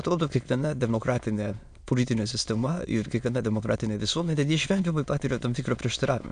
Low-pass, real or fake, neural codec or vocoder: 9.9 kHz; fake; autoencoder, 22.05 kHz, a latent of 192 numbers a frame, VITS, trained on many speakers